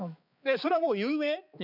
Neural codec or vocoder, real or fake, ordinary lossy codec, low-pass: codec, 16 kHz, 4 kbps, X-Codec, HuBERT features, trained on balanced general audio; fake; none; 5.4 kHz